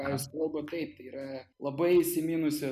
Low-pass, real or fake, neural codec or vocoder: 14.4 kHz; real; none